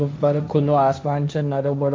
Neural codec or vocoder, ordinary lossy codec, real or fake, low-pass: codec, 16 kHz, 1.1 kbps, Voila-Tokenizer; none; fake; none